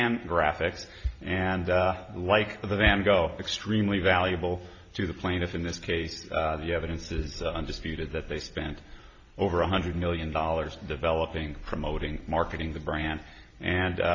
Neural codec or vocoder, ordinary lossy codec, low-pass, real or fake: none; AAC, 48 kbps; 7.2 kHz; real